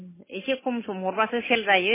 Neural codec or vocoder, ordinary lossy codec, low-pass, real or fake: none; MP3, 16 kbps; 3.6 kHz; real